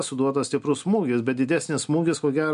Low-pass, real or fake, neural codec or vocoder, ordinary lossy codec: 10.8 kHz; real; none; MP3, 64 kbps